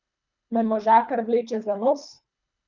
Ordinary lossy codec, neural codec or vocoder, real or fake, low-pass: none; codec, 24 kHz, 3 kbps, HILCodec; fake; 7.2 kHz